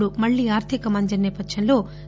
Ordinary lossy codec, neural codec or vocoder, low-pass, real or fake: none; none; none; real